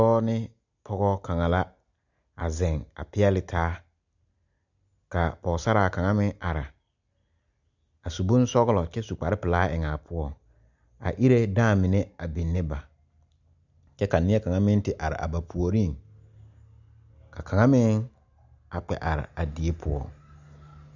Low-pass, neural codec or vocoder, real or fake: 7.2 kHz; none; real